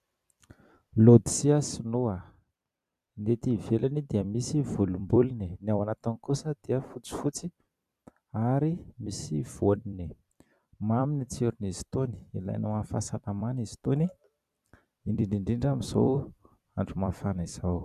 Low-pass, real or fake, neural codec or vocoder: 14.4 kHz; fake; vocoder, 44.1 kHz, 128 mel bands every 256 samples, BigVGAN v2